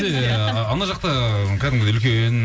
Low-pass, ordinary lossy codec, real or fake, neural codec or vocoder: none; none; real; none